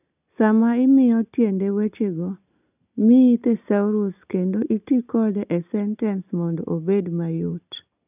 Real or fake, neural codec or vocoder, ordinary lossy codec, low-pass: real; none; none; 3.6 kHz